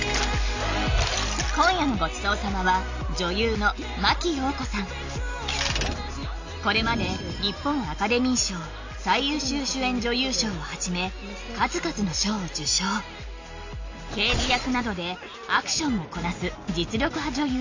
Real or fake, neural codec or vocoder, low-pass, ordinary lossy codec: real; none; 7.2 kHz; AAC, 48 kbps